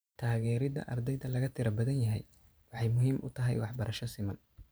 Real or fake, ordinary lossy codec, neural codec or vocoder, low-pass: fake; none; vocoder, 44.1 kHz, 128 mel bands every 512 samples, BigVGAN v2; none